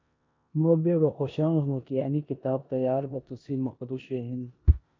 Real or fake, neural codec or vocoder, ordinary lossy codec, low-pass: fake; codec, 16 kHz in and 24 kHz out, 0.9 kbps, LongCat-Audio-Codec, four codebook decoder; MP3, 48 kbps; 7.2 kHz